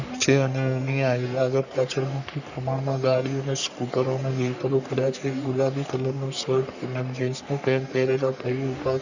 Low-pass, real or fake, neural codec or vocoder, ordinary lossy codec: 7.2 kHz; fake; codec, 44.1 kHz, 3.4 kbps, Pupu-Codec; Opus, 64 kbps